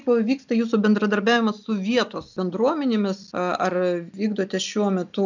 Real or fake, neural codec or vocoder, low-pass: real; none; 7.2 kHz